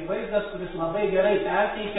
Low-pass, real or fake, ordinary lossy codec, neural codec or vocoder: 7.2 kHz; real; AAC, 16 kbps; none